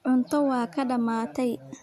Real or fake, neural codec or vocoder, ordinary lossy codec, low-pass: real; none; none; 14.4 kHz